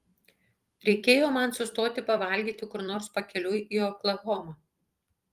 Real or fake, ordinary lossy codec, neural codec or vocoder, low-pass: fake; Opus, 32 kbps; vocoder, 44.1 kHz, 128 mel bands every 256 samples, BigVGAN v2; 14.4 kHz